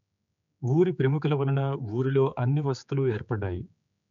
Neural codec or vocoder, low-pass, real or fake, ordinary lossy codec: codec, 16 kHz, 4 kbps, X-Codec, HuBERT features, trained on general audio; 7.2 kHz; fake; none